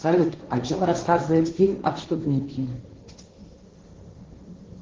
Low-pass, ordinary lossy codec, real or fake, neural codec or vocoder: 7.2 kHz; Opus, 16 kbps; fake; codec, 16 kHz, 1.1 kbps, Voila-Tokenizer